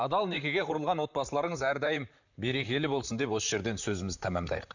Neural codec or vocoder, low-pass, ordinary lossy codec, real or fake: vocoder, 44.1 kHz, 128 mel bands, Pupu-Vocoder; 7.2 kHz; none; fake